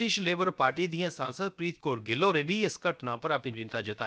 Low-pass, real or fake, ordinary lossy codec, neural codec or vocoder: none; fake; none; codec, 16 kHz, 0.7 kbps, FocalCodec